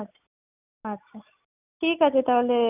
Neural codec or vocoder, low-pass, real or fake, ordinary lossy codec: none; 3.6 kHz; real; none